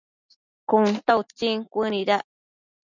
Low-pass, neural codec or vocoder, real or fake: 7.2 kHz; none; real